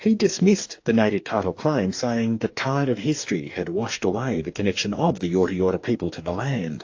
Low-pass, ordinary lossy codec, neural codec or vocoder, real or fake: 7.2 kHz; AAC, 48 kbps; codec, 44.1 kHz, 2.6 kbps, DAC; fake